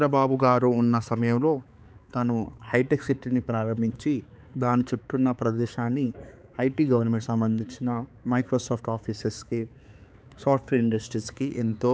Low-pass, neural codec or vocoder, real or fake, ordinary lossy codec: none; codec, 16 kHz, 4 kbps, X-Codec, HuBERT features, trained on balanced general audio; fake; none